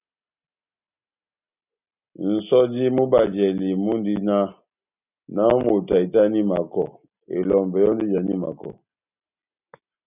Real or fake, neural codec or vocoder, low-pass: real; none; 3.6 kHz